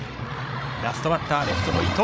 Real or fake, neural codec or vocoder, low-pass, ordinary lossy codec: fake; codec, 16 kHz, 16 kbps, FreqCodec, larger model; none; none